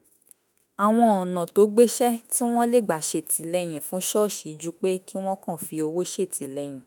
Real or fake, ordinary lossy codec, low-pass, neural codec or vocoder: fake; none; none; autoencoder, 48 kHz, 32 numbers a frame, DAC-VAE, trained on Japanese speech